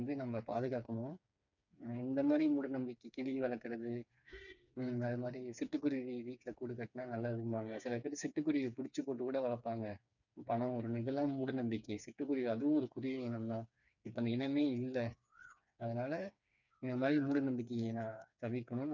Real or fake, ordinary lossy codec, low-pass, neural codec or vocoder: fake; none; 7.2 kHz; codec, 16 kHz, 4 kbps, FreqCodec, smaller model